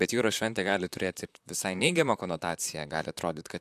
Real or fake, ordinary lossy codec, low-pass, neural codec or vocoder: fake; AAC, 96 kbps; 14.4 kHz; vocoder, 44.1 kHz, 128 mel bands, Pupu-Vocoder